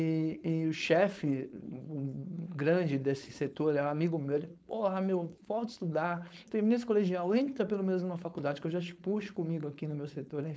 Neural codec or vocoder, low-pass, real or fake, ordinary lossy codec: codec, 16 kHz, 4.8 kbps, FACodec; none; fake; none